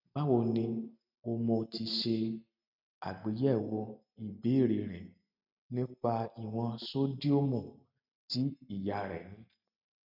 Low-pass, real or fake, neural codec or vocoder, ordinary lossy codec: 5.4 kHz; real; none; none